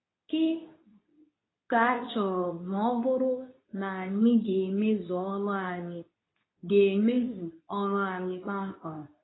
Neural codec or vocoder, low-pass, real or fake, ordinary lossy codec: codec, 24 kHz, 0.9 kbps, WavTokenizer, medium speech release version 2; 7.2 kHz; fake; AAC, 16 kbps